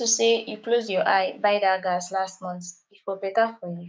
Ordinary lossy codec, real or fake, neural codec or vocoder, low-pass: none; fake; codec, 16 kHz, 6 kbps, DAC; none